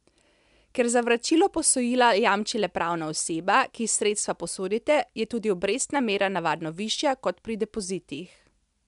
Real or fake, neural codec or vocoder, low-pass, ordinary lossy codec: real; none; 10.8 kHz; MP3, 96 kbps